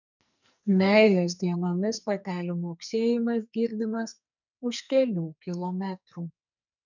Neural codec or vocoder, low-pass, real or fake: codec, 44.1 kHz, 2.6 kbps, SNAC; 7.2 kHz; fake